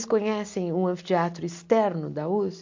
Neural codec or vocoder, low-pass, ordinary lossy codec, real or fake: none; 7.2 kHz; MP3, 48 kbps; real